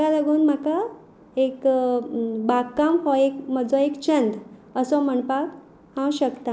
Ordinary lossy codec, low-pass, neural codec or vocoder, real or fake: none; none; none; real